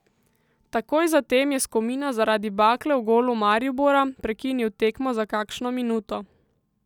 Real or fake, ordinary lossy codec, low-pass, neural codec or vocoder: real; none; 19.8 kHz; none